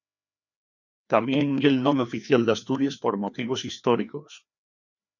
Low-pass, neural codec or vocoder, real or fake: 7.2 kHz; codec, 16 kHz, 2 kbps, FreqCodec, larger model; fake